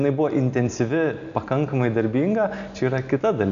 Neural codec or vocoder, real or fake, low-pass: none; real; 7.2 kHz